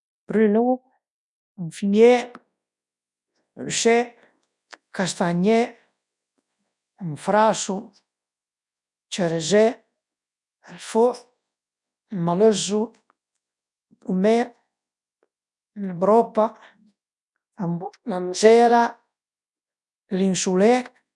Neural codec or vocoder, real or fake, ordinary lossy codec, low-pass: codec, 24 kHz, 0.9 kbps, WavTokenizer, large speech release; fake; none; 10.8 kHz